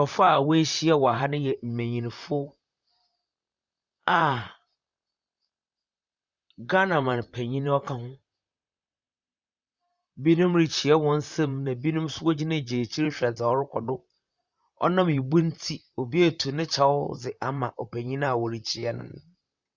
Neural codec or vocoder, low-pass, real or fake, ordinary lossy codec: vocoder, 44.1 kHz, 128 mel bands, Pupu-Vocoder; 7.2 kHz; fake; Opus, 64 kbps